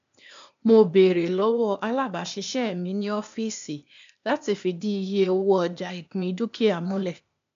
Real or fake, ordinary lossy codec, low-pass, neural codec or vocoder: fake; AAC, 64 kbps; 7.2 kHz; codec, 16 kHz, 0.8 kbps, ZipCodec